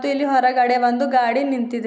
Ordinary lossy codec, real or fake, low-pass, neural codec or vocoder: none; real; none; none